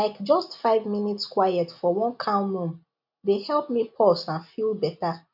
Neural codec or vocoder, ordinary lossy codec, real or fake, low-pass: none; none; real; 5.4 kHz